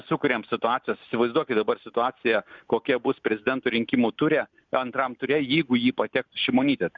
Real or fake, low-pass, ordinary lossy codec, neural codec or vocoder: real; 7.2 kHz; Opus, 64 kbps; none